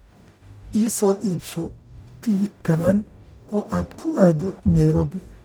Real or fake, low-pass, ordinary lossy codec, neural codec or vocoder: fake; none; none; codec, 44.1 kHz, 0.9 kbps, DAC